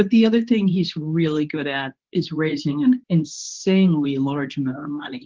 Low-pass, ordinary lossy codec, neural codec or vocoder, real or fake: 7.2 kHz; Opus, 16 kbps; codec, 24 kHz, 0.9 kbps, WavTokenizer, medium speech release version 2; fake